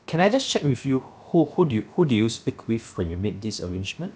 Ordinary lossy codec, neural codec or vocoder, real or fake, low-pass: none; codec, 16 kHz, about 1 kbps, DyCAST, with the encoder's durations; fake; none